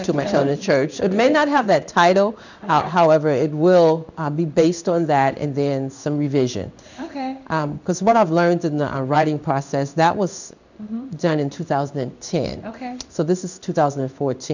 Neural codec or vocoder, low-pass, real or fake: codec, 16 kHz in and 24 kHz out, 1 kbps, XY-Tokenizer; 7.2 kHz; fake